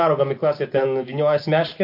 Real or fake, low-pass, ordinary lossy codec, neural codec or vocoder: real; 5.4 kHz; MP3, 32 kbps; none